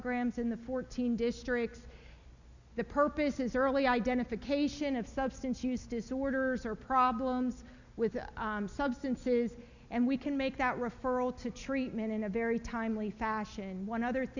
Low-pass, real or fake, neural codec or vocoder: 7.2 kHz; real; none